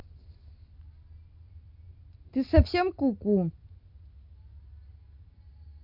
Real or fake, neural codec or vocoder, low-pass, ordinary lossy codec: real; none; 5.4 kHz; none